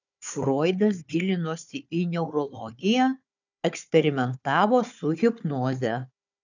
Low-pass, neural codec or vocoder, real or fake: 7.2 kHz; codec, 16 kHz, 4 kbps, FunCodec, trained on Chinese and English, 50 frames a second; fake